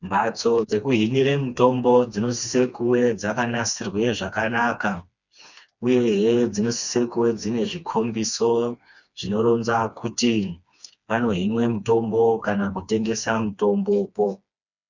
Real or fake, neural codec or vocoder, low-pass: fake; codec, 16 kHz, 2 kbps, FreqCodec, smaller model; 7.2 kHz